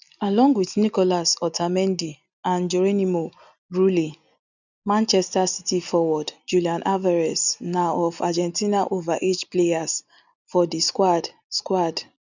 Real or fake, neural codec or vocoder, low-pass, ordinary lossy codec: real; none; 7.2 kHz; none